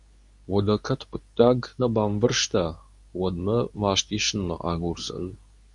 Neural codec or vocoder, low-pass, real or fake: codec, 24 kHz, 0.9 kbps, WavTokenizer, medium speech release version 2; 10.8 kHz; fake